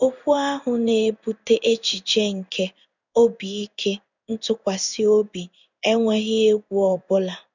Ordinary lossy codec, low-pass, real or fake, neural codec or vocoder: none; 7.2 kHz; fake; codec, 16 kHz in and 24 kHz out, 1 kbps, XY-Tokenizer